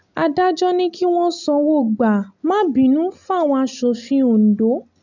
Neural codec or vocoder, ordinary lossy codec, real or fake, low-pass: none; none; real; 7.2 kHz